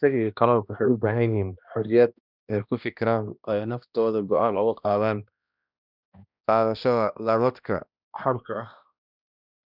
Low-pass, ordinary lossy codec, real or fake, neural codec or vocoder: 5.4 kHz; none; fake; codec, 16 kHz, 1 kbps, X-Codec, HuBERT features, trained on balanced general audio